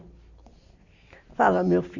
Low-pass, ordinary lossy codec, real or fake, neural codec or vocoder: 7.2 kHz; none; real; none